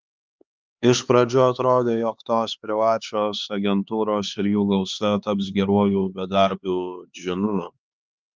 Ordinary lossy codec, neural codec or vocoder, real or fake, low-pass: Opus, 24 kbps; codec, 16 kHz, 4 kbps, X-Codec, HuBERT features, trained on LibriSpeech; fake; 7.2 kHz